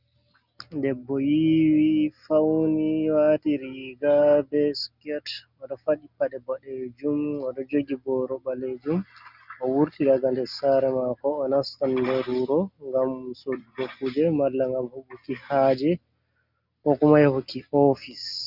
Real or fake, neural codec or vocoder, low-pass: real; none; 5.4 kHz